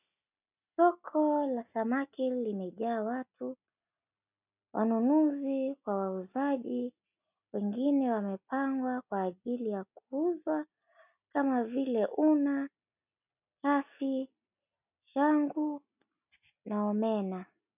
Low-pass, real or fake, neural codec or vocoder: 3.6 kHz; real; none